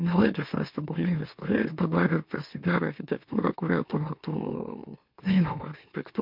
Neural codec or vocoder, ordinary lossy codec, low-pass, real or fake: autoencoder, 44.1 kHz, a latent of 192 numbers a frame, MeloTTS; MP3, 48 kbps; 5.4 kHz; fake